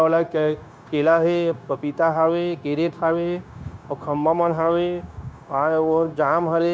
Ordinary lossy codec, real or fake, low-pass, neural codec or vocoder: none; fake; none; codec, 16 kHz, 0.9 kbps, LongCat-Audio-Codec